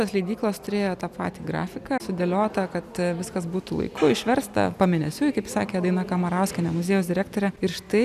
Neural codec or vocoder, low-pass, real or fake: none; 14.4 kHz; real